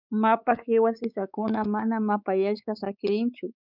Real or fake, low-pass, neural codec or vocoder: fake; 5.4 kHz; codec, 16 kHz, 4 kbps, X-Codec, WavLM features, trained on Multilingual LibriSpeech